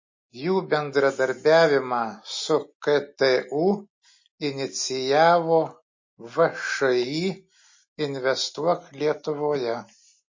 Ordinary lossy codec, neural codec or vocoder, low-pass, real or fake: MP3, 32 kbps; none; 7.2 kHz; real